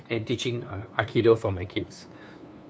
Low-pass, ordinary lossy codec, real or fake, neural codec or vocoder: none; none; fake; codec, 16 kHz, 2 kbps, FunCodec, trained on LibriTTS, 25 frames a second